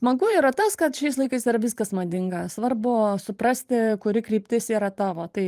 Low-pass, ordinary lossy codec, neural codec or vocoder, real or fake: 14.4 kHz; Opus, 32 kbps; vocoder, 44.1 kHz, 128 mel bands every 512 samples, BigVGAN v2; fake